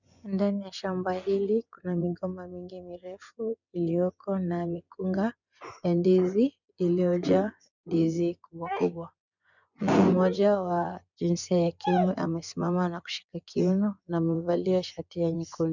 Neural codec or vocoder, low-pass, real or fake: vocoder, 24 kHz, 100 mel bands, Vocos; 7.2 kHz; fake